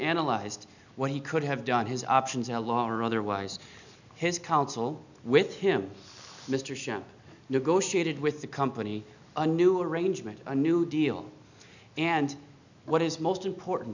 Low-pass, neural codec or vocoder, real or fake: 7.2 kHz; none; real